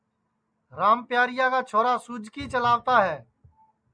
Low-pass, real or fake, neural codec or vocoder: 9.9 kHz; real; none